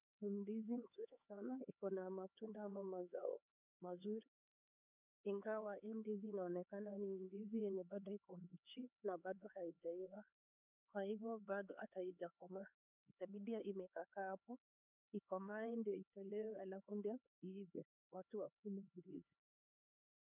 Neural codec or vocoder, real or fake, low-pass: codec, 16 kHz, 4 kbps, X-Codec, HuBERT features, trained on LibriSpeech; fake; 3.6 kHz